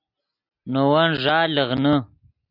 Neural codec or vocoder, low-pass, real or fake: none; 5.4 kHz; real